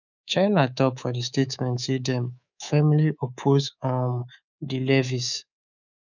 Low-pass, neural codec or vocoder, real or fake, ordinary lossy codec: 7.2 kHz; codec, 24 kHz, 3.1 kbps, DualCodec; fake; none